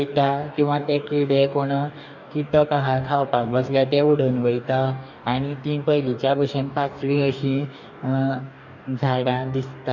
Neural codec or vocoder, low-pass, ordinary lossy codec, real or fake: codec, 44.1 kHz, 2.6 kbps, DAC; 7.2 kHz; none; fake